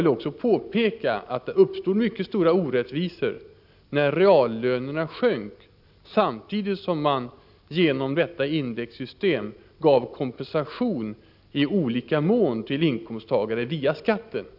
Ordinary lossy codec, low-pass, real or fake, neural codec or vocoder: AAC, 48 kbps; 5.4 kHz; real; none